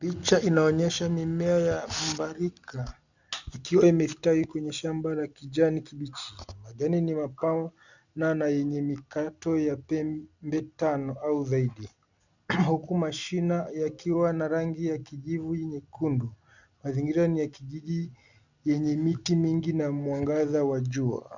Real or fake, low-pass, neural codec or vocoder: real; 7.2 kHz; none